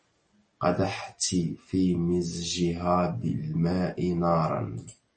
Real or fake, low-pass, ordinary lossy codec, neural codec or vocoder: real; 9.9 kHz; MP3, 32 kbps; none